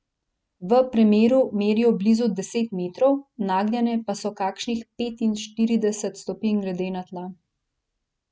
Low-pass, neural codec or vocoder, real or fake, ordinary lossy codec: none; none; real; none